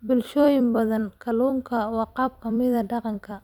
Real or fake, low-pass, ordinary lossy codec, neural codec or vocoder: fake; 19.8 kHz; none; vocoder, 44.1 kHz, 128 mel bands every 256 samples, BigVGAN v2